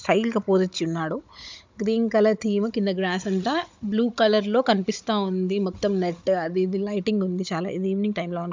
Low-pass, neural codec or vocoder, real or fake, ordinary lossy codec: 7.2 kHz; codec, 16 kHz, 16 kbps, FunCodec, trained on Chinese and English, 50 frames a second; fake; MP3, 64 kbps